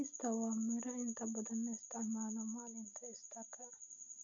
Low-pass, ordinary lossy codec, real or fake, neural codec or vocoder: 7.2 kHz; none; real; none